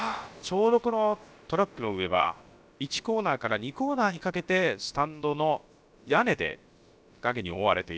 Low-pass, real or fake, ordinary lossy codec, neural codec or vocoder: none; fake; none; codec, 16 kHz, about 1 kbps, DyCAST, with the encoder's durations